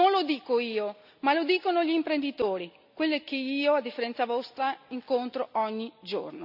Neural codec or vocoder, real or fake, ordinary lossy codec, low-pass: none; real; none; 5.4 kHz